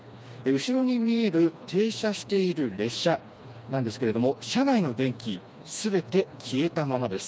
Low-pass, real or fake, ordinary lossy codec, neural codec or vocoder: none; fake; none; codec, 16 kHz, 2 kbps, FreqCodec, smaller model